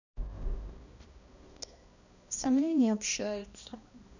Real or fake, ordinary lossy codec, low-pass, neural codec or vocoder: fake; none; 7.2 kHz; codec, 16 kHz, 1 kbps, X-Codec, HuBERT features, trained on balanced general audio